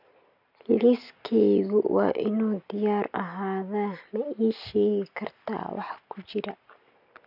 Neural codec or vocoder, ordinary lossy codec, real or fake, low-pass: none; none; real; 5.4 kHz